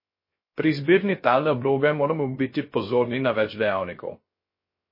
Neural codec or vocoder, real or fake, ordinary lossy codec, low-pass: codec, 16 kHz, 0.3 kbps, FocalCodec; fake; MP3, 24 kbps; 5.4 kHz